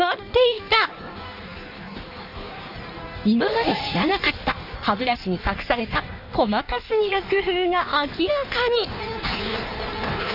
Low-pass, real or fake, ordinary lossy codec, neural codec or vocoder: 5.4 kHz; fake; none; codec, 16 kHz in and 24 kHz out, 1.1 kbps, FireRedTTS-2 codec